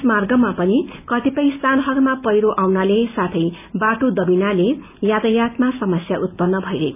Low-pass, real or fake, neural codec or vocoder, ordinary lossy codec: 3.6 kHz; real; none; none